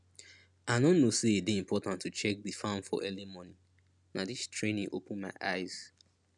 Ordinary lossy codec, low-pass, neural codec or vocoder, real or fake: none; 10.8 kHz; none; real